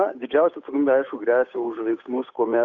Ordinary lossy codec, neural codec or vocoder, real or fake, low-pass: Opus, 64 kbps; codec, 16 kHz, 8 kbps, FunCodec, trained on Chinese and English, 25 frames a second; fake; 7.2 kHz